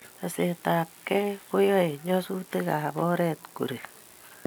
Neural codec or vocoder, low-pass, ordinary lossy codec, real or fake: none; none; none; real